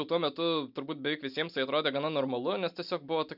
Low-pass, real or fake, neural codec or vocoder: 5.4 kHz; real; none